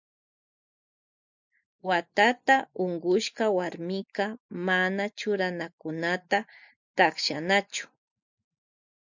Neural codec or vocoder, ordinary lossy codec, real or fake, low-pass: none; MP3, 64 kbps; real; 7.2 kHz